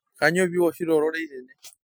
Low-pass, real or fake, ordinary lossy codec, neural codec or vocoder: none; real; none; none